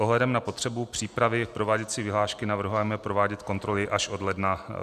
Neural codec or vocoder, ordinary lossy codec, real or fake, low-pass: none; Opus, 64 kbps; real; 14.4 kHz